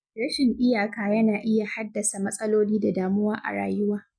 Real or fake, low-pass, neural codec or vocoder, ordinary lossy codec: real; 9.9 kHz; none; none